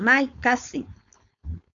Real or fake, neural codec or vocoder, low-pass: fake; codec, 16 kHz, 4.8 kbps, FACodec; 7.2 kHz